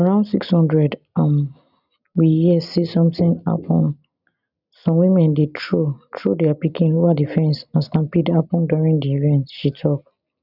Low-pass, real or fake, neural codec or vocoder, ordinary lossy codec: 5.4 kHz; real; none; none